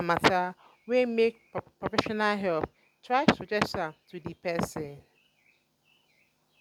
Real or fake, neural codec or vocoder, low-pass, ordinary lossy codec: real; none; 19.8 kHz; none